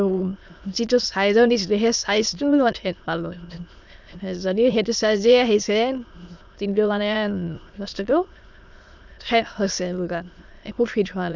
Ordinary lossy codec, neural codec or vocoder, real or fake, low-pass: none; autoencoder, 22.05 kHz, a latent of 192 numbers a frame, VITS, trained on many speakers; fake; 7.2 kHz